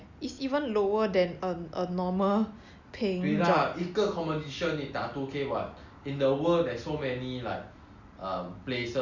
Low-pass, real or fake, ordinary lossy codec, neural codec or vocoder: 7.2 kHz; real; Opus, 64 kbps; none